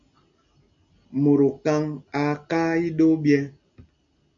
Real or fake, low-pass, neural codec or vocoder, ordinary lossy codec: real; 7.2 kHz; none; AAC, 64 kbps